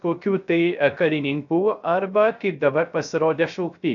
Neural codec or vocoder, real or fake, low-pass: codec, 16 kHz, 0.3 kbps, FocalCodec; fake; 7.2 kHz